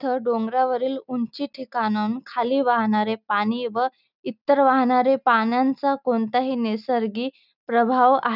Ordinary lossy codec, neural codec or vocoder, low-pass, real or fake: none; none; 5.4 kHz; real